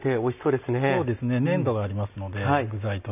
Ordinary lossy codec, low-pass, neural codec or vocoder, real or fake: none; 3.6 kHz; none; real